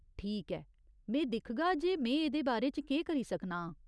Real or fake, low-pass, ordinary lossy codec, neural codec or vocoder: real; none; none; none